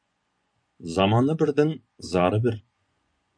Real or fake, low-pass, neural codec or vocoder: fake; 9.9 kHz; vocoder, 24 kHz, 100 mel bands, Vocos